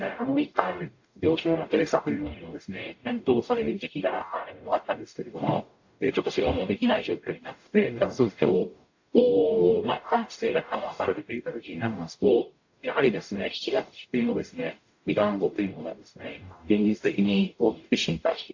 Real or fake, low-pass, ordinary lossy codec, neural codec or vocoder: fake; 7.2 kHz; AAC, 48 kbps; codec, 44.1 kHz, 0.9 kbps, DAC